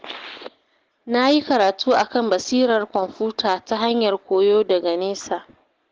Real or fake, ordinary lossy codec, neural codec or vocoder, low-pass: real; Opus, 16 kbps; none; 7.2 kHz